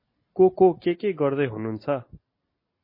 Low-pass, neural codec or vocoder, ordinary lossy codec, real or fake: 5.4 kHz; none; MP3, 24 kbps; real